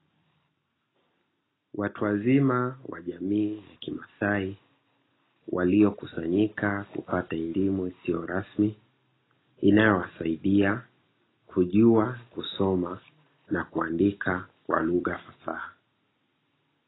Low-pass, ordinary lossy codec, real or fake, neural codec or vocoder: 7.2 kHz; AAC, 16 kbps; real; none